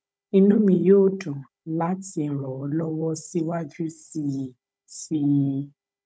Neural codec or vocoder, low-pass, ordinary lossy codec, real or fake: codec, 16 kHz, 16 kbps, FunCodec, trained on Chinese and English, 50 frames a second; none; none; fake